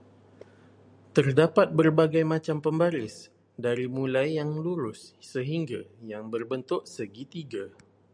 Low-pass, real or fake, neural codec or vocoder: 9.9 kHz; real; none